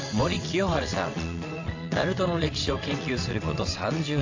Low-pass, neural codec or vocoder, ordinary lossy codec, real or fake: 7.2 kHz; vocoder, 22.05 kHz, 80 mel bands, WaveNeXt; AAC, 48 kbps; fake